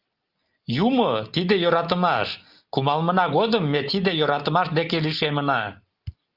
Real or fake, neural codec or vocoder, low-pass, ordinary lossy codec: real; none; 5.4 kHz; Opus, 24 kbps